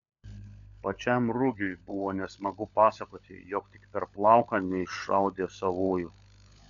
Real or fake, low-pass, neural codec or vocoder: fake; 7.2 kHz; codec, 16 kHz, 16 kbps, FunCodec, trained on LibriTTS, 50 frames a second